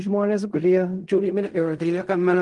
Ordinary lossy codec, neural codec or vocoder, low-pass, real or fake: Opus, 24 kbps; codec, 16 kHz in and 24 kHz out, 0.4 kbps, LongCat-Audio-Codec, fine tuned four codebook decoder; 10.8 kHz; fake